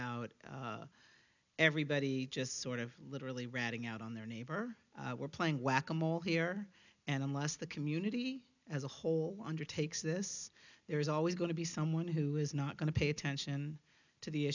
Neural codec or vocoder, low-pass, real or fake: none; 7.2 kHz; real